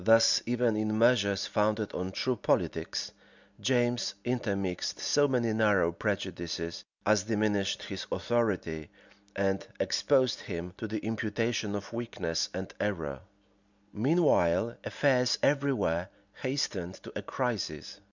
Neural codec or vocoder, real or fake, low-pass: none; real; 7.2 kHz